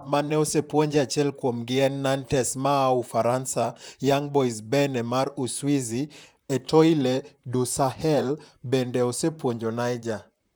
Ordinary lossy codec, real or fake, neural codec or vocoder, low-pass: none; fake; vocoder, 44.1 kHz, 128 mel bands, Pupu-Vocoder; none